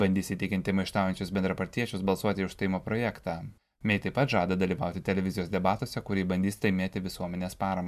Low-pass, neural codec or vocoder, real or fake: 14.4 kHz; none; real